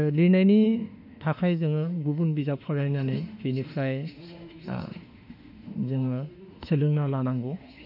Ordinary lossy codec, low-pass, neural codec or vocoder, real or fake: none; 5.4 kHz; autoencoder, 48 kHz, 32 numbers a frame, DAC-VAE, trained on Japanese speech; fake